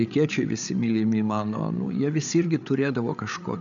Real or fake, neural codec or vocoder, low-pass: fake; codec, 16 kHz, 8 kbps, FreqCodec, larger model; 7.2 kHz